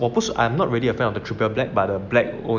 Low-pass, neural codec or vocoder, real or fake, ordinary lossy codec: 7.2 kHz; none; real; none